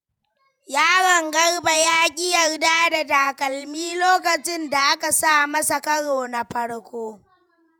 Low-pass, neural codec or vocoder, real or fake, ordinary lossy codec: none; vocoder, 48 kHz, 128 mel bands, Vocos; fake; none